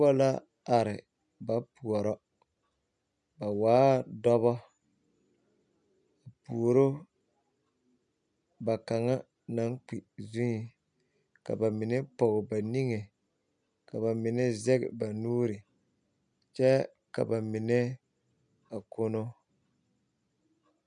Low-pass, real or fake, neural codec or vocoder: 9.9 kHz; real; none